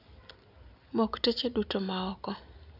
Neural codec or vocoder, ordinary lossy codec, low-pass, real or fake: none; none; 5.4 kHz; real